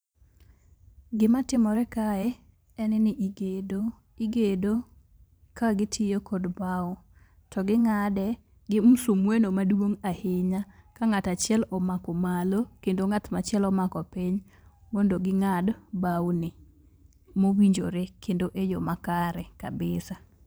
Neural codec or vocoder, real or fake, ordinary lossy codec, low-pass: vocoder, 44.1 kHz, 128 mel bands every 512 samples, BigVGAN v2; fake; none; none